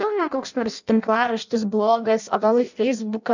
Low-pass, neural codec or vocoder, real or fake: 7.2 kHz; codec, 16 kHz in and 24 kHz out, 0.6 kbps, FireRedTTS-2 codec; fake